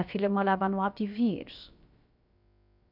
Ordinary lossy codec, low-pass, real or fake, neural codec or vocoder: none; 5.4 kHz; fake; codec, 16 kHz, about 1 kbps, DyCAST, with the encoder's durations